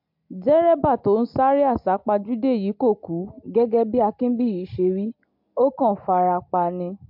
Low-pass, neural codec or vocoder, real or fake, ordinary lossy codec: 5.4 kHz; none; real; MP3, 48 kbps